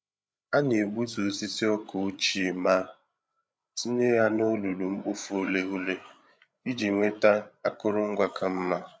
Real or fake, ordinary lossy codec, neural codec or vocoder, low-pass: fake; none; codec, 16 kHz, 8 kbps, FreqCodec, larger model; none